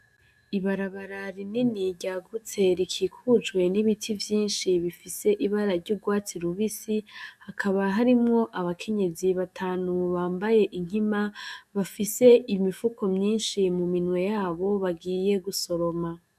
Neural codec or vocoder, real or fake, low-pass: autoencoder, 48 kHz, 128 numbers a frame, DAC-VAE, trained on Japanese speech; fake; 14.4 kHz